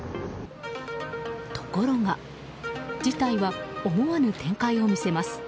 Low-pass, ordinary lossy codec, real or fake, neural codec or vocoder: none; none; real; none